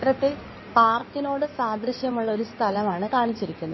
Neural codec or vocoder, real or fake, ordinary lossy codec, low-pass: codec, 16 kHz in and 24 kHz out, 2.2 kbps, FireRedTTS-2 codec; fake; MP3, 24 kbps; 7.2 kHz